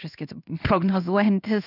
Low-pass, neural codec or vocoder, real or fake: 5.4 kHz; codec, 16 kHz in and 24 kHz out, 1 kbps, XY-Tokenizer; fake